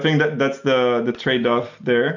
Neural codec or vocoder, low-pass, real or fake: none; 7.2 kHz; real